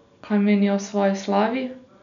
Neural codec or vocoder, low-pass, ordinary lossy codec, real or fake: none; 7.2 kHz; none; real